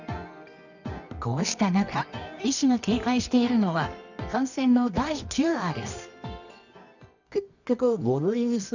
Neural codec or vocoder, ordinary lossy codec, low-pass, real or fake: codec, 24 kHz, 0.9 kbps, WavTokenizer, medium music audio release; none; 7.2 kHz; fake